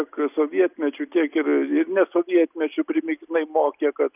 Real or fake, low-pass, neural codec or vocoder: real; 3.6 kHz; none